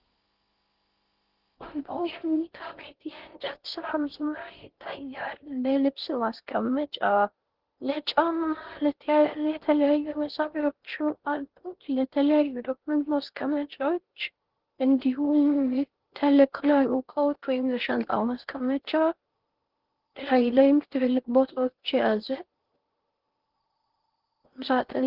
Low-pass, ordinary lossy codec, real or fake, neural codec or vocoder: 5.4 kHz; Opus, 16 kbps; fake; codec, 16 kHz in and 24 kHz out, 0.6 kbps, FocalCodec, streaming, 4096 codes